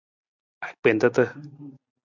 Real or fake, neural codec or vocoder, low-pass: real; none; 7.2 kHz